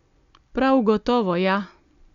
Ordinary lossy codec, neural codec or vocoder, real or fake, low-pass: Opus, 64 kbps; none; real; 7.2 kHz